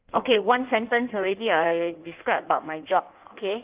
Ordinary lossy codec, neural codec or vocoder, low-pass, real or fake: Opus, 32 kbps; codec, 16 kHz in and 24 kHz out, 1.1 kbps, FireRedTTS-2 codec; 3.6 kHz; fake